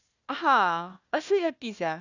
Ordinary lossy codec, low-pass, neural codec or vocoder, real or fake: none; 7.2 kHz; codec, 16 kHz, 1 kbps, FunCodec, trained on Chinese and English, 50 frames a second; fake